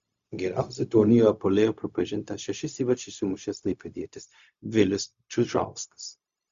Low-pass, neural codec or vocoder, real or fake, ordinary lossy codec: 7.2 kHz; codec, 16 kHz, 0.4 kbps, LongCat-Audio-Codec; fake; Opus, 64 kbps